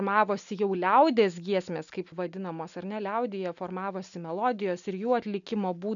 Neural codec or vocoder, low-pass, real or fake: none; 7.2 kHz; real